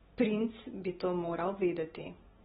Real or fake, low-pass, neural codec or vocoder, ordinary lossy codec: real; 7.2 kHz; none; AAC, 16 kbps